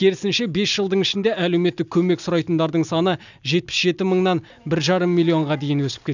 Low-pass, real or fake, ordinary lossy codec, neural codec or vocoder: 7.2 kHz; real; none; none